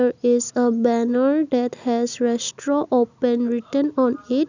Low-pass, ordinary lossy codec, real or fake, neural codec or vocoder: 7.2 kHz; none; real; none